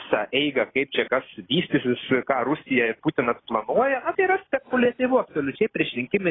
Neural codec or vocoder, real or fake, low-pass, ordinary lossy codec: none; real; 7.2 kHz; AAC, 16 kbps